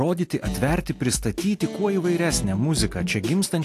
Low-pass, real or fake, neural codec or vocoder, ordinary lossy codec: 14.4 kHz; real; none; AAC, 64 kbps